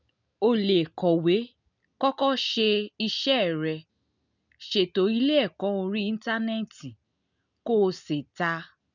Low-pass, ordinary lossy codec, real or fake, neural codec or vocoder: 7.2 kHz; none; real; none